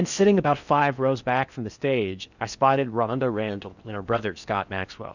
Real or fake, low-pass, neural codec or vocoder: fake; 7.2 kHz; codec, 16 kHz in and 24 kHz out, 0.6 kbps, FocalCodec, streaming, 4096 codes